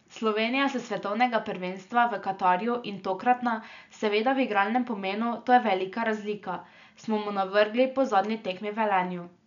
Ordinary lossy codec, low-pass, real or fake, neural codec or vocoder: none; 7.2 kHz; real; none